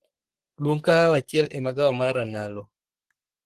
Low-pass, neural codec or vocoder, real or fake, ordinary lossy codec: 14.4 kHz; codec, 44.1 kHz, 3.4 kbps, Pupu-Codec; fake; Opus, 16 kbps